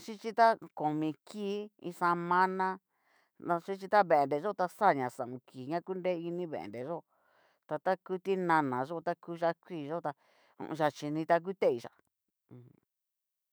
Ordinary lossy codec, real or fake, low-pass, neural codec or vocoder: none; fake; none; autoencoder, 48 kHz, 128 numbers a frame, DAC-VAE, trained on Japanese speech